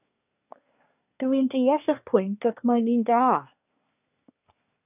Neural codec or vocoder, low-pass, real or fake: codec, 24 kHz, 1 kbps, SNAC; 3.6 kHz; fake